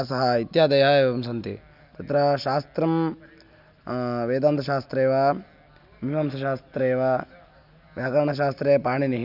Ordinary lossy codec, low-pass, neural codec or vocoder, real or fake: none; 5.4 kHz; none; real